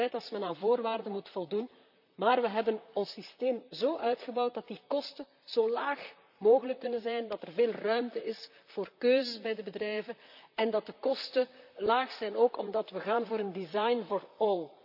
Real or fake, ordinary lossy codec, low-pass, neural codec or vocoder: fake; none; 5.4 kHz; vocoder, 44.1 kHz, 128 mel bands, Pupu-Vocoder